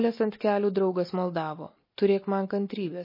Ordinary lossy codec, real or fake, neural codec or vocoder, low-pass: MP3, 24 kbps; real; none; 5.4 kHz